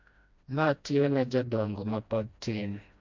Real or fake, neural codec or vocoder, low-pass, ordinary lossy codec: fake; codec, 16 kHz, 1 kbps, FreqCodec, smaller model; 7.2 kHz; MP3, 64 kbps